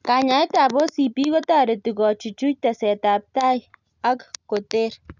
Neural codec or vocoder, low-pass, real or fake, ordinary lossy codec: none; 7.2 kHz; real; none